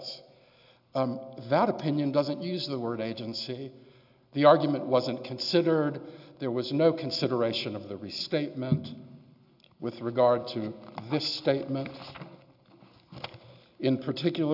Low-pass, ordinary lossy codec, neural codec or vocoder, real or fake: 5.4 kHz; AAC, 48 kbps; none; real